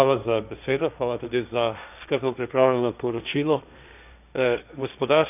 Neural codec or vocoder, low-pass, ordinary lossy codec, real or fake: codec, 16 kHz, 1.1 kbps, Voila-Tokenizer; 3.6 kHz; none; fake